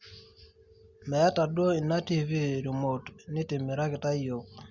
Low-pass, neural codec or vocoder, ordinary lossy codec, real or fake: 7.2 kHz; none; Opus, 64 kbps; real